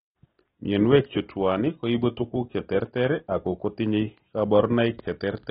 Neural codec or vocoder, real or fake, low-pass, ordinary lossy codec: none; real; 10.8 kHz; AAC, 16 kbps